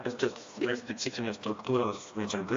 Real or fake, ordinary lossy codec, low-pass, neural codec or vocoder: fake; AAC, 48 kbps; 7.2 kHz; codec, 16 kHz, 1 kbps, FreqCodec, smaller model